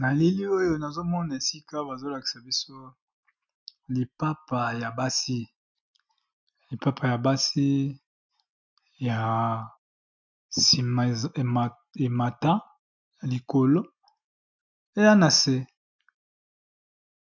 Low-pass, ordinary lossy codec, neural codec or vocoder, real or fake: 7.2 kHz; MP3, 64 kbps; none; real